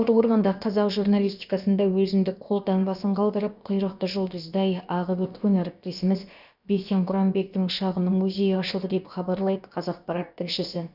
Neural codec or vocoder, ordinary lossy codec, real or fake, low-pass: codec, 16 kHz, about 1 kbps, DyCAST, with the encoder's durations; none; fake; 5.4 kHz